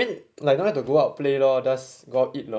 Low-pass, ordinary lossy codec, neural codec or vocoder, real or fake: none; none; none; real